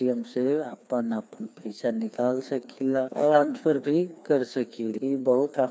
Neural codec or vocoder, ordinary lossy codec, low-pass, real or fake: codec, 16 kHz, 2 kbps, FreqCodec, larger model; none; none; fake